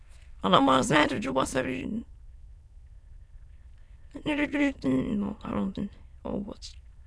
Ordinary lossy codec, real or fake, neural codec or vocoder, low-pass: none; fake; autoencoder, 22.05 kHz, a latent of 192 numbers a frame, VITS, trained on many speakers; none